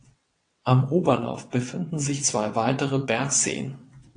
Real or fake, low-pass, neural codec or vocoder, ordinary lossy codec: fake; 9.9 kHz; vocoder, 22.05 kHz, 80 mel bands, WaveNeXt; AAC, 48 kbps